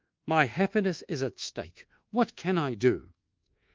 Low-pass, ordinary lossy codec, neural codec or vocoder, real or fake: 7.2 kHz; Opus, 32 kbps; codec, 24 kHz, 1.2 kbps, DualCodec; fake